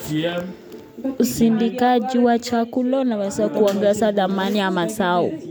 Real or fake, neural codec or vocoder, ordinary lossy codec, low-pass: real; none; none; none